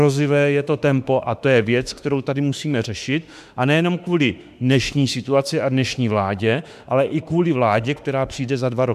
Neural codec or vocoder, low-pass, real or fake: autoencoder, 48 kHz, 32 numbers a frame, DAC-VAE, trained on Japanese speech; 14.4 kHz; fake